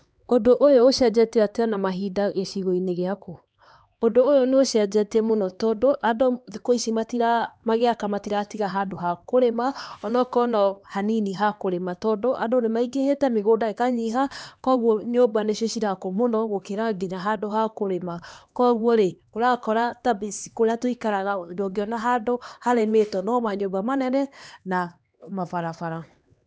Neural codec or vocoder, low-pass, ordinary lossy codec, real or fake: codec, 16 kHz, 2 kbps, X-Codec, HuBERT features, trained on LibriSpeech; none; none; fake